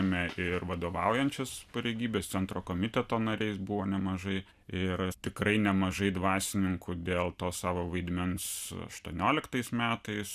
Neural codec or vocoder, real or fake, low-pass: none; real; 14.4 kHz